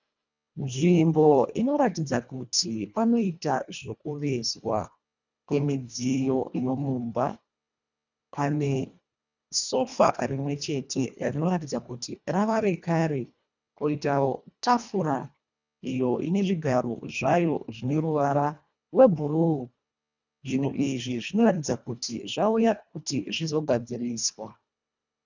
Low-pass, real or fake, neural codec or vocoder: 7.2 kHz; fake; codec, 24 kHz, 1.5 kbps, HILCodec